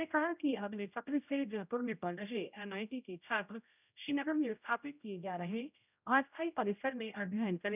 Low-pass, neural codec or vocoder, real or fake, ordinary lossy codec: 3.6 kHz; codec, 16 kHz, 0.5 kbps, X-Codec, HuBERT features, trained on general audio; fake; none